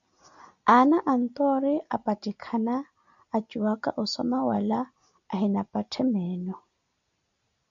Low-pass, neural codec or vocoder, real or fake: 7.2 kHz; none; real